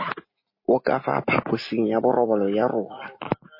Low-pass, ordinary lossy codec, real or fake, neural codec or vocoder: 5.4 kHz; MP3, 24 kbps; real; none